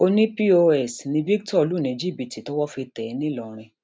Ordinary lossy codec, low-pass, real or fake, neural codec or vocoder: none; none; real; none